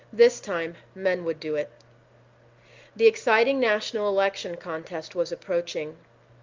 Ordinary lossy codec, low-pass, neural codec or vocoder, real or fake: Opus, 32 kbps; 7.2 kHz; none; real